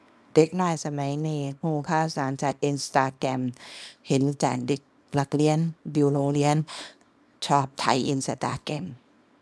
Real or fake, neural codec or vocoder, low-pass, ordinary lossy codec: fake; codec, 24 kHz, 0.9 kbps, WavTokenizer, small release; none; none